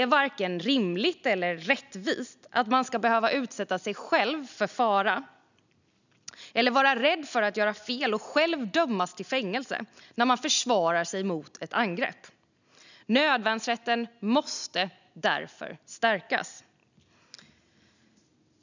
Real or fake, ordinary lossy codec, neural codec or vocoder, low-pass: real; none; none; 7.2 kHz